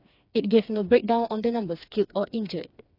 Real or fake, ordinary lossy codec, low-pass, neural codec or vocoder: fake; AAC, 32 kbps; 5.4 kHz; codec, 16 kHz, 4 kbps, FreqCodec, smaller model